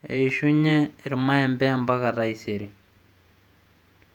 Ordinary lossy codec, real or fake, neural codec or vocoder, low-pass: none; fake; vocoder, 48 kHz, 128 mel bands, Vocos; 19.8 kHz